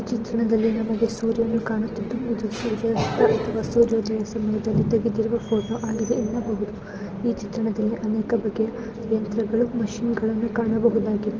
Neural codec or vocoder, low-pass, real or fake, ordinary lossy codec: none; 7.2 kHz; real; Opus, 16 kbps